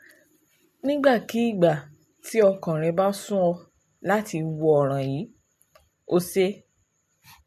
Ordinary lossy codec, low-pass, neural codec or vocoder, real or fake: MP3, 64 kbps; 14.4 kHz; none; real